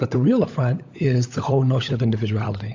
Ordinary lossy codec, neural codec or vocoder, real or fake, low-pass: AAC, 48 kbps; codec, 16 kHz, 16 kbps, FunCodec, trained on LibriTTS, 50 frames a second; fake; 7.2 kHz